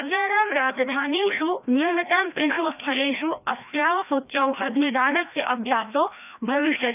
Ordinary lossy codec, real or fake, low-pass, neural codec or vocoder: none; fake; 3.6 kHz; codec, 16 kHz, 1 kbps, FreqCodec, larger model